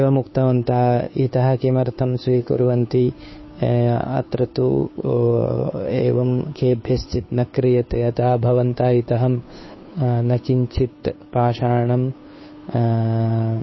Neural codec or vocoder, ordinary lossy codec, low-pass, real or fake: codec, 16 kHz, 2 kbps, FunCodec, trained on Chinese and English, 25 frames a second; MP3, 24 kbps; 7.2 kHz; fake